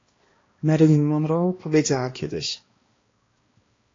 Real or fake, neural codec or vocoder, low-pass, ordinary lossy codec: fake; codec, 16 kHz, 1 kbps, X-Codec, HuBERT features, trained on balanced general audio; 7.2 kHz; AAC, 32 kbps